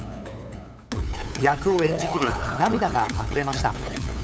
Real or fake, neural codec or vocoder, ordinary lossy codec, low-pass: fake; codec, 16 kHz, 8 kbps, FunCodec, trained on LibriTTS, 25 frames a second; none; none